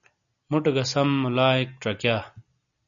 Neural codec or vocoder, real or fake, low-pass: none; real; 7.2 kHz